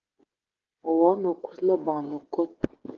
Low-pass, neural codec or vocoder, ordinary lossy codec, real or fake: 7.2 kHz; codec, 16 kHz, 16 kbps, FreqCodec, smaller model; Opus, 16 kbps; fake